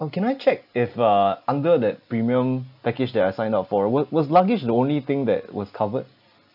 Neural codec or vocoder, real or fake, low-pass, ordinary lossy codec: none; real; 5.4 kHz; none